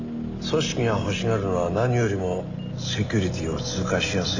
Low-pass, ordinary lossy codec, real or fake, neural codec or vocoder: 7.2 kHz; AAC, 48 kbps; real; none